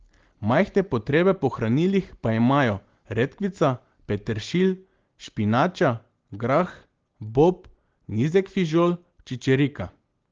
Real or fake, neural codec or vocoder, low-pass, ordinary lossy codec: real; none; 7.2 kHz; Opus, 16 kbps